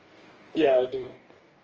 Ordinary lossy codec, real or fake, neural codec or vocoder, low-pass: Opus, 24 kbps; fake; codec, 44.1 kHz, 2.6 kbps, DAC; 7.2 kHz